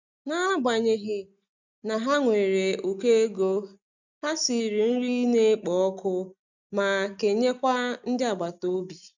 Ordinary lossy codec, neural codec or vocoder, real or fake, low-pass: none; none; real; 7.2 kHz